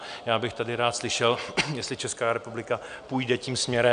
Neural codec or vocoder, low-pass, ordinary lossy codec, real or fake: none; 9.9 kHz; MP3, 96 kbps; real